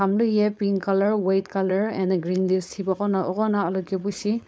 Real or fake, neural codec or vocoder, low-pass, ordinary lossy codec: fake; codec, 16 kHz, 4.8 kbps, FACodec; none; none